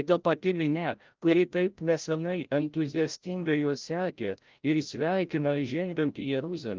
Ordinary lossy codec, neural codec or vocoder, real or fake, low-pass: Opus, 32 kbps; codec, 16 kHz, 0.5 kbps, FreqCodec, larger model; fake; 7.2 kHz